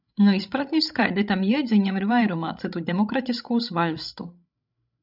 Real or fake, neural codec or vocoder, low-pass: fake; codec, 16 kHz, 16 kbps, FreqCodec, larger model; 5.4 kHz